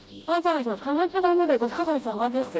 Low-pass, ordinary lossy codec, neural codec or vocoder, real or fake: none; none; codec, 16 kHz, 0.5 kbps, FreqCodec, smaller model; fake